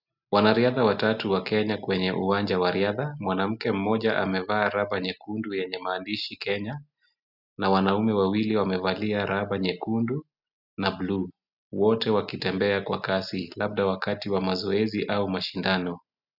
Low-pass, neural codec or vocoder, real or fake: 5.4 kHz; none; real